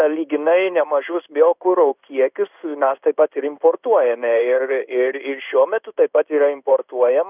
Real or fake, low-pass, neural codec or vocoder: fake; 3.6 kHz; codec, 16 kHz in and 24 kHz out, 1 kbps, XY-Tokenizer